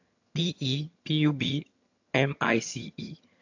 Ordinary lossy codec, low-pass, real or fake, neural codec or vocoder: AAC, 48 kbps; 7.2 kHz; fake; vocoder, 22.05 kHz, 80 mel bands, HiFi-GAN